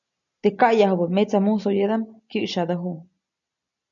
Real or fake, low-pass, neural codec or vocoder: real; 7.2 kHz; none